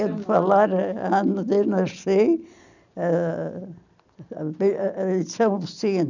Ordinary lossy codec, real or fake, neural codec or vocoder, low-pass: none; real; none; 7.2 kHz